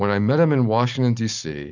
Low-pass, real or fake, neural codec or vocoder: 7.2 kHz; real; none